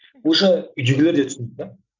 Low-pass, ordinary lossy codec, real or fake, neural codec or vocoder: 7.2 kHz; none; real; none